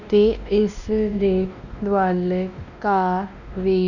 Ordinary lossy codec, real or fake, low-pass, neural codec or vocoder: none; fake; 7.2 kHz; codec, 16 kHz, 1 kbps, X-Codec, WavLM features, trained on Multilingual LibriSpeech